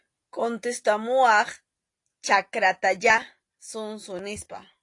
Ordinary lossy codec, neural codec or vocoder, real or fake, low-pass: AAC, 48 kbps; none; real; 10.8 kHz